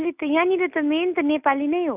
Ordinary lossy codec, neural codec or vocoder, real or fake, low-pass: none; none; real; 3.6 kHz